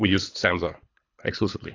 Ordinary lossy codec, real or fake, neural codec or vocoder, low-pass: AAC, 32 kbps; fake; codec, 24 kHz, 3 kbps, HILCodec; 7.2 kHz